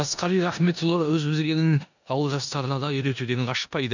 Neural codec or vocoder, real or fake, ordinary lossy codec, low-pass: codec, 16 kHz in and 24 kHz out, 0.9 kbps, LongCat-Audio-Codec, four codebook decoder; fake; none; 7.2 kHz